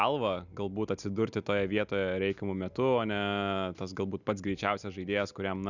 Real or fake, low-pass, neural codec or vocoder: real; 7.2 kHz; none